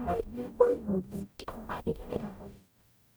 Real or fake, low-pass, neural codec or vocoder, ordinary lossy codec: fake; none; codec, 44.1 kHz, 0.9 kbps, DAC; none